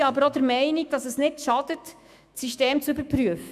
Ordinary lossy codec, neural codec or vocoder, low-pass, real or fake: none; autoencoder, 48 kHz, 128 numbers a frame, DAC-VAE, trained on Japanese speech; 14.4 kHz; fake